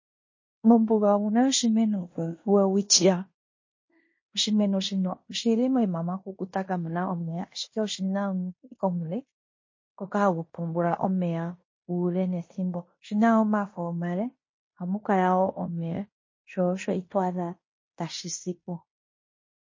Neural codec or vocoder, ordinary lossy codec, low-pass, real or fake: codec, 16 kHz in and 24 kHz out, 0.9 kbps, LongCat-Audio-Codec, fine tuned four codebook decoder; MP3, 32 kbps; 7.2 kHz; fake